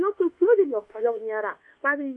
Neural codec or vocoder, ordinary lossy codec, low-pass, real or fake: codec, 24 kHz, 1.2 kbps, DualCodec; AAC, 48 kbps; 10.8 kHz; fake